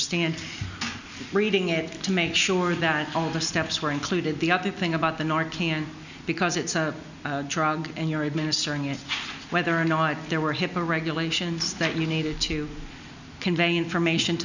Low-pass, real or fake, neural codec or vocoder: 7.2 kHz; real; none